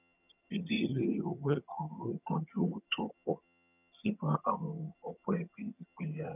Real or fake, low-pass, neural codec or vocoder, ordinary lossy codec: fake; 3.6 kHz; vocoder, 22.05 kHz, 80 mel bands, HiFi-GAN; none